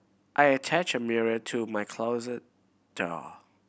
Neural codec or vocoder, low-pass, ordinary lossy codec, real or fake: none; none; none; real